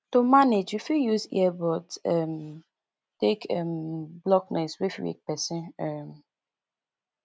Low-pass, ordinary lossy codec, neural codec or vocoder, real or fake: none; none; none; real